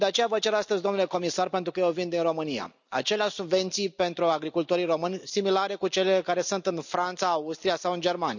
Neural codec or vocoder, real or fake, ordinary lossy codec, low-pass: none; real; none; 7.2 kHz